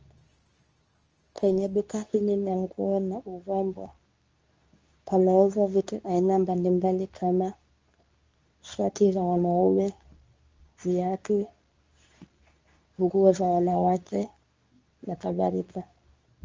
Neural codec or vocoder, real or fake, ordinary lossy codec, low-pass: codec, 24 kHz, 0.9 kbps, WavTokenizer, medium speech release version 2; fake; Opus, 24 kbps; 7.2 kHz